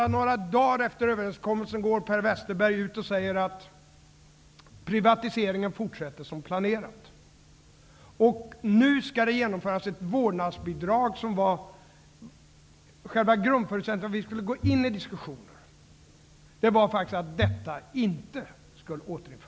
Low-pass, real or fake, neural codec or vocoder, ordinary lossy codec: none; real; none; none